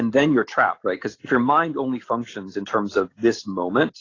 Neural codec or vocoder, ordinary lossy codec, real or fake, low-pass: none; AAC, 32 kbps; real; 7.2 kHz